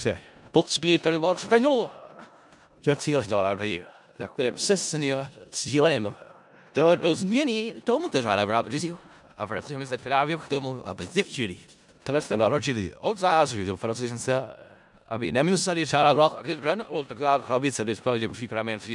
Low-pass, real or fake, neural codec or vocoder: 10.8 kHz; fake; codec, 16 kHz in and 24 kHz out, 0.4 kbps, LongCat-Audio-Codec, four codebook decoder